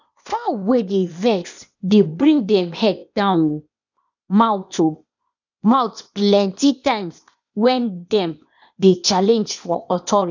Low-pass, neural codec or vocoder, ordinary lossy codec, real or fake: 7.2 kHz; codec, 16 kHz, 0.8 kbps, ZipCodec; none; fake